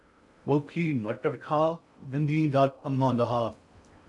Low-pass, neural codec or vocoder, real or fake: 10.8 kHz; codec, 16 kHz in and 24 kHz out, 0.6 kbps, FocalCodec, streaming, 2048 codes; fake